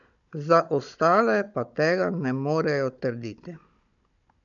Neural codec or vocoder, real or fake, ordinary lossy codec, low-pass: codec, 16 kHz, 16 kbps, FunCodec, trained on Chinese and English, 50 frames a second; fake; none; 7.2 kHz